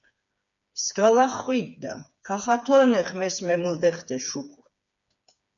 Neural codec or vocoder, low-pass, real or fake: codec, 16 kHz, 4 kbps, FreqCodec, smaller model; 7.2 kHz; fake